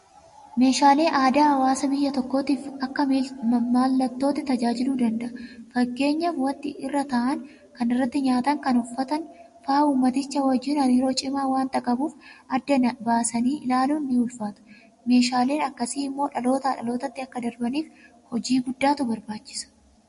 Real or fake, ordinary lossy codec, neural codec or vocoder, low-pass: real; MP3, 48 kbps; none; 14.4 kHz